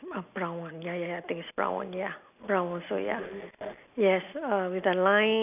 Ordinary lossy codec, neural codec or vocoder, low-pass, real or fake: none; none; 3.6 kHz; real